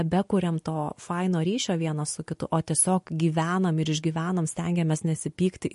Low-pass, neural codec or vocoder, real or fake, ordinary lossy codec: 14.4 kHz; none; real; MP3, 48 kbps